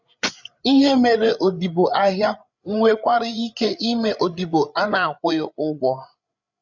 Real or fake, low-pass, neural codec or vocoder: fake; 7.2 kHz; codec, 16 kHz, 8 kbps, FreqCodec, larger model